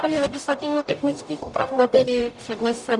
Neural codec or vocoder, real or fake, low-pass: codec, 44.1 kHz, 0.9 kbps, DAC; fake; 10.8 kHz